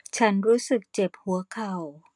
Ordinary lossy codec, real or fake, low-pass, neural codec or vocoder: none; real; 10.8 kHz; none